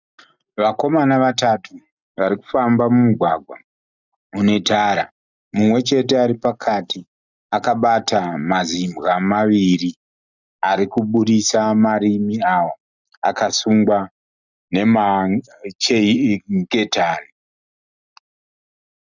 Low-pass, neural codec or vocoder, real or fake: 7.2 kHz; none; real